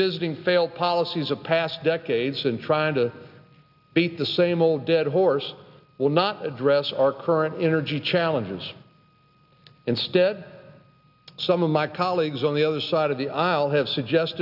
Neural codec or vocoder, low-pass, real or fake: none; 5.4 kHz; real